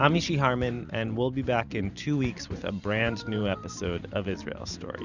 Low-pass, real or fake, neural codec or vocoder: 7.2 kHz; fake; vocoder, 44.1 kHz, 128 mel bands every 512 samples, BigVGAN v2